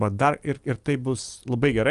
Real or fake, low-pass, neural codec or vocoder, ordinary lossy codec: real; 10.8 kHz; none; Opus, 32 kbps